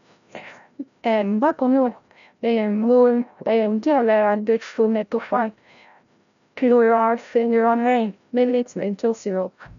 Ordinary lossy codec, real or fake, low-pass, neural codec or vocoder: MP3, 96 kbps; fake; 7.2 kHz; codec, 16 kHz, 0.5 kbps, FreqCodec, larger model